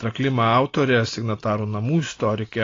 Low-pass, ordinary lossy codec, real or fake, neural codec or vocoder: 7.2 kHz; AAC, 32 kbps; real; none